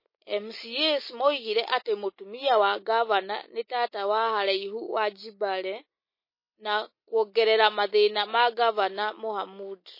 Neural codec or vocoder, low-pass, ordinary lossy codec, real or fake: none; 5.4 kHz; MP3, 24 kbps; real